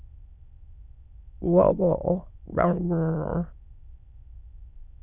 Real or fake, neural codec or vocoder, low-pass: fake; autoencoder, 22.05 kHz, a latent of 192 numbers a frame, VITS, trained on many speakers; 3.6 kHz